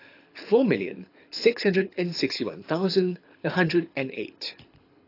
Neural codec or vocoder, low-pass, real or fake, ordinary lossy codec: codec, 24 kHz, 6 kbps, HILCodec; 5.4 kHz; fake; AAC, 32 kbps